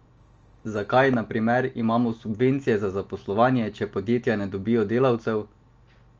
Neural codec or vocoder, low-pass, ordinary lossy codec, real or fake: none; 7.2 kHz; Opus, 24 kbps; real